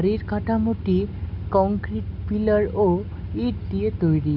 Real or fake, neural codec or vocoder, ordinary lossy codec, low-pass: real; none; none; 5.4 kHz